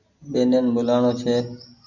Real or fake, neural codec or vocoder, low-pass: real; none; 7.2 kHz